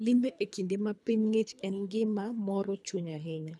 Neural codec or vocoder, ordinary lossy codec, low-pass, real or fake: codec, 24 kHz, 3 kbps, HILCodec; none; none; fake